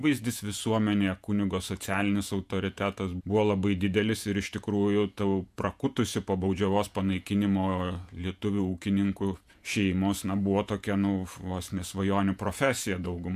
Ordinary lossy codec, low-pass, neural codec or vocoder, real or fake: AAC, 96 kbps; 14.4 kHz; vocoder, 48 kHz, 128 mel bands, Vocos; fake